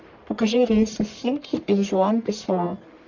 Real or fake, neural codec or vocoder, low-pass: fake; codec, 44.1 kHz, 1.7 kbps, Pupu-Codec; 7.2 kHz